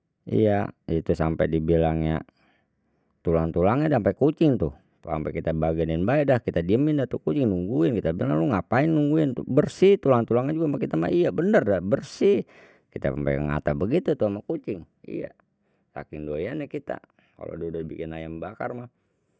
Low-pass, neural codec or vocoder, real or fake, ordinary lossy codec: none; none; real; none